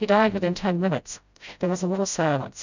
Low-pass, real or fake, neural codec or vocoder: 7.2 kHz; fake; codec, 16 kHz, 0.5 kbps, FreqCodec, smaller model